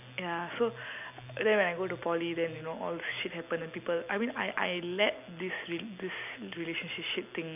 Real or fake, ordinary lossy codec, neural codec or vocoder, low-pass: real; none; none; 3.6 kHz